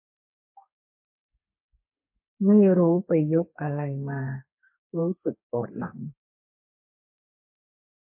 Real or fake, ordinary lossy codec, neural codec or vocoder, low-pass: fake; MP3, 24 kbps; codec, 32 kHz, 1.9 kbps, SNAC; 3.6 kHz